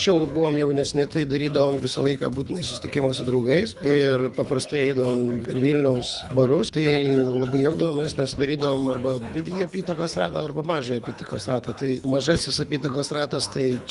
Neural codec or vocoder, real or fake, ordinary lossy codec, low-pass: codec, 24 kHz, 3 kbps, HILCodec; fake; Opus, 64 kbps; 10.8 kHz